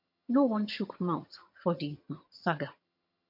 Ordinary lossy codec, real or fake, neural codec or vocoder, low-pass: MP3, 32 kbps; fake; vocoder, 22.05 kHz, 80 mel bands, HiFi-GAN; 5.4 kHz